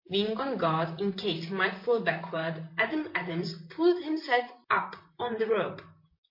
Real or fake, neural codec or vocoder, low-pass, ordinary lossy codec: fake; vocoder, 44.1 kHz, 128 mel bands, Pupu-Vocoder; 5.4 kHz; MP3, 32 kbps